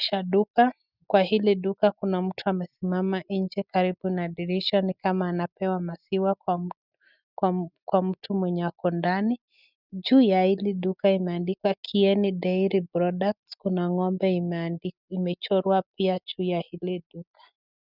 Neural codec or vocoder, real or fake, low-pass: none; real; 5.4 kHz